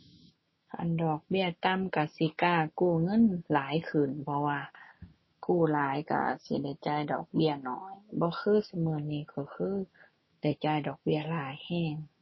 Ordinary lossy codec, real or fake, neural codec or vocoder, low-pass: MP3, 24 kbps; fake; autoencoder, 48 kHz, 128 numbers a frame, DAC-VAE, trained on Japanese speech; 7.2 kHz